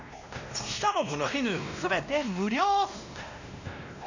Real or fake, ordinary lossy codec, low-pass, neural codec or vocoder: fake; none; 7.2 kHz; codec, 16 kHz, 1 kbps, X-Codec, WavLM features, trained on Multilingual LibriSpeech